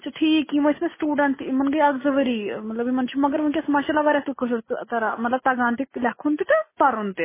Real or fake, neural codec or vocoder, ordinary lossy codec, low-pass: real; none; MP3, 16 kbps; 3.6 kHz